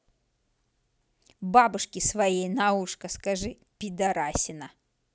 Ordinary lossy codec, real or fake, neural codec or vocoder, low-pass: none; real; none; none